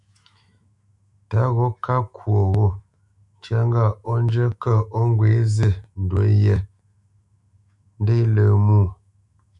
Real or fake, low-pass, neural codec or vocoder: fake; 10.8 kHz; autoencoder, 48 kHz, 128 numbers a frame, DAC-VAE, trained on Japanese speech